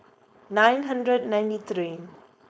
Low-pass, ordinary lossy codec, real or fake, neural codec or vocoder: none; none; fake; codec, 16 kHz, 4.8 kbps, FACodec